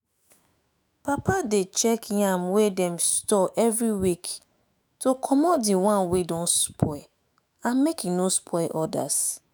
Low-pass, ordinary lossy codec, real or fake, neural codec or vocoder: none; none; fake; autoencoder, 48 kHz, 128 numbers a frame, DAC-VAE, trained on Japanese speech